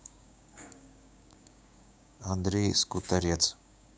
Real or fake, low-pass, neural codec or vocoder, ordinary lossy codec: fake; none; codec, 16 kHz, 6 kbps, DAC; none